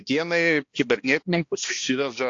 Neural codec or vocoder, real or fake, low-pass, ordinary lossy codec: codec, 16 kHz, 1 kbps, X-Codec, HuBERT features, trained on balanced general audio; fake; 7.2 kHz; AAC, 48 kbps